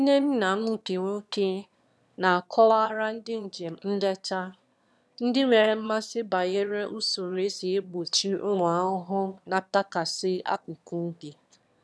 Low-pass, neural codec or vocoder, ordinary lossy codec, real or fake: none; autoencoder, 22.05 kHz, a latent of 192 numbers a frame, VITS, trained on one speaker; none; fake